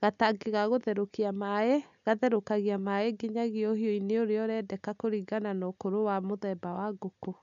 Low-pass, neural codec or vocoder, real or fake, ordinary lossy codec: 7.2 kHz; none; real; none